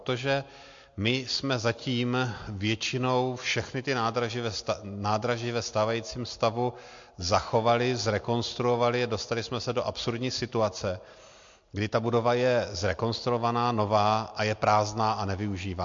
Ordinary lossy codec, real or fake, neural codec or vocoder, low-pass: MP3, 48 kbps; real; none; 7.2 kHz